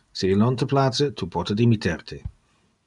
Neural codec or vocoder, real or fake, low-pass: none; real; 10.8 kHz